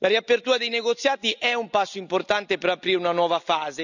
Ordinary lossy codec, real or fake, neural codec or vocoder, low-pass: none; real; none; 7.2 kHz